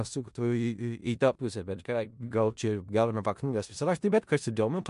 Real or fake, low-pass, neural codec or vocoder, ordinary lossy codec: fake; 10.8 kHz; codec, 16 kHz in and 24 kHz out, 0.4 kbps, LongCat-Audio-Codec, four codebook decoder; MP3, 64 kbps